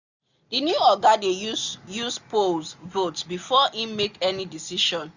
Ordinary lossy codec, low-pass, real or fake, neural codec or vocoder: none; 7.2 kHz; real; none